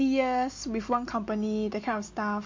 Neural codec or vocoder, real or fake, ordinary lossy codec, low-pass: none; real; MP3, 48 kbps; 7.2 kHz